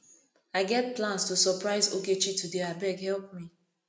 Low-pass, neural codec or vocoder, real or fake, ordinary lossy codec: none; none; real; none